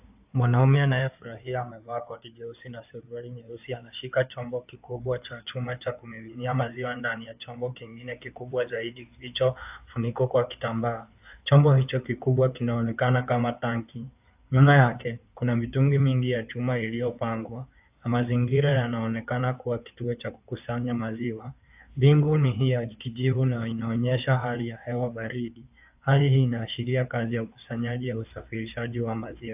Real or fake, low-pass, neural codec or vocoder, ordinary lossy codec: fake; 3.6 kHz; codec, 16 kHz in and 24 kHz out, 2.2 kbps, FireRedTTS-2 codec; AAC, 32 kbps